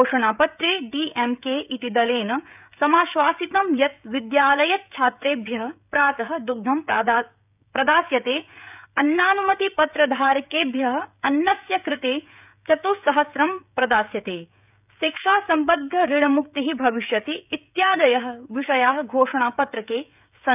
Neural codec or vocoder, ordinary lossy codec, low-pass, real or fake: codec, 16 kHz, 16 kbps, FreqCodec, smaller model; none; 3.6 kHz; fake